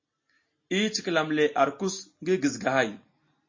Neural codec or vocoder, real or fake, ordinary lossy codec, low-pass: none; real; MP3, 32 kbps; 7.2 kHz